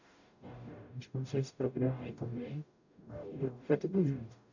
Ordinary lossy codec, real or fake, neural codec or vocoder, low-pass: none; fake; codec, 44.1 kHz, 0.9 kbps, DAC; 7.2 kHz